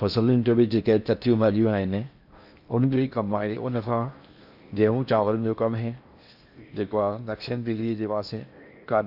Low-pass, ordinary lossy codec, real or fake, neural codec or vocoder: 5.4 kHz; Opus, 64 kbps; fake; codec, 16 kHz in and 24 kHz out, 0.6 kbps, FocalCodec, streaming, 4096 codes